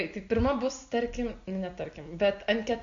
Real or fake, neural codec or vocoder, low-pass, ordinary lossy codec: real; none; 7.2 kHz; MP3, 48 kbps